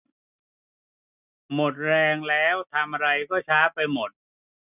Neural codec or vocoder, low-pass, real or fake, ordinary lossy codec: none; 3.6 kHz; real; none